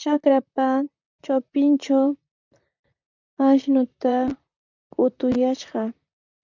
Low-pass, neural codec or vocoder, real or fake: 7.2 kHz; vocoder, 44.1 kHz, 128 mel bands, Pupu-Vocoder; fake